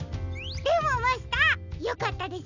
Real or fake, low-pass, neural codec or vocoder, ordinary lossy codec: real; 7.2 kHz; none; none